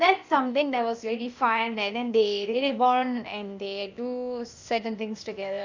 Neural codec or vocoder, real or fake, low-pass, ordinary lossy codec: codec, 16 kHz, 0.8 kbps, ZipCodec; fake; 7.2 kHz; Opus, 64 kbps